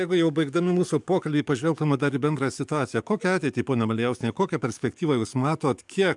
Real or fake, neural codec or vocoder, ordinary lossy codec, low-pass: fake; codec, 44.1 kHz, 7.8 kbps, DAC; MP3, 96 kbps; 10.8 kHz